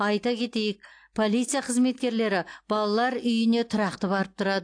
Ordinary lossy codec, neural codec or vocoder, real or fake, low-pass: AAC, 48 kbps; none; real; 9.9 kHz